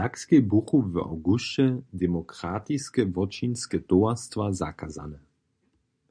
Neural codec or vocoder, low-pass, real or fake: none; 9.9 kHz; real